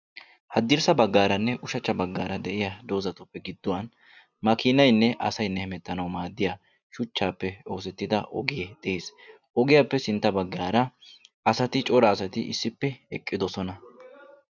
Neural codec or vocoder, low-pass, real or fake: none; 7.2 kHz; real